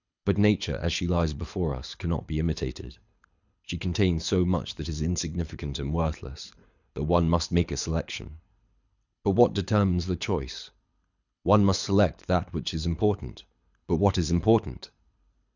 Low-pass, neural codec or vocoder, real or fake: 7.2 kHz; codec, 24 kHz, 6 kbps, HILCodec; fake